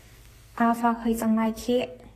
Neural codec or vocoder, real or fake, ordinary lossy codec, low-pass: codec, 44.1 kHz, 2.6 kbps, SNAC; fake; AAC, 48 kbps; 14.4 kHz